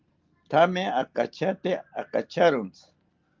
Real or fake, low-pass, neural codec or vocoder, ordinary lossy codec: real; 7.2 kHz; none; Opus, 32 kbps